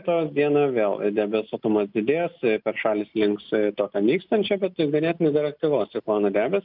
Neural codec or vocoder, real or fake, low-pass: none; real; 5.4 kHz